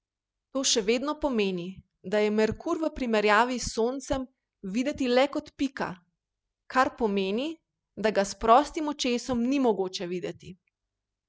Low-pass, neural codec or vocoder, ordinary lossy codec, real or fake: none; none; none; real